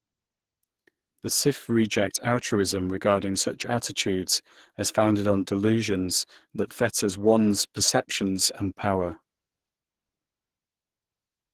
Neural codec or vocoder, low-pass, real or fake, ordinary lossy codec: codec, 44.1 kHz, 2.6 kbps, SNAC; 14.4 kHz; fake; Opus, 16 kbps